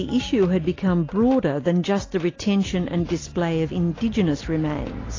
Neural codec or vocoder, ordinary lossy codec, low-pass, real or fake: none; AAC, 32 kbps; 7.2 kHz; real